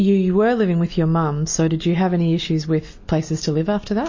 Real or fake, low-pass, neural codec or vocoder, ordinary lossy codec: real; 7.2 kHz; none; MP3, 32 kbps